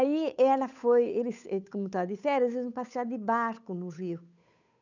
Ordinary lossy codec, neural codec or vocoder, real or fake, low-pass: none; codec, 16 kHz, 8 kbps, FunCodec, trained on Chinese and English, 25 frames a second; fake; 7.2 kHz